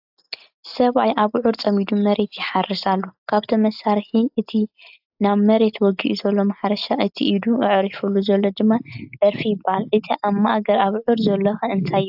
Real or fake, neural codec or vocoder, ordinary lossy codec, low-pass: real; none; AAC, 48 kbps; 5.4 kHz